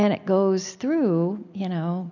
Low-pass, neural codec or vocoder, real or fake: 7.2 kHz; none; real